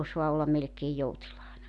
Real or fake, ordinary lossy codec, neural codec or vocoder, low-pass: real; none; none; 10.8 kHz